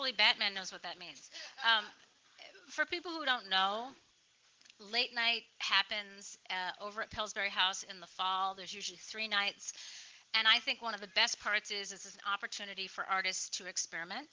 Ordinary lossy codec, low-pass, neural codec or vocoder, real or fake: Opus, 16 kbps; 7.2 kHz; none; real